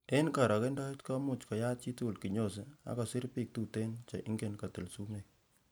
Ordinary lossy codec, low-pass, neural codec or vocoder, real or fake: none; none; none; real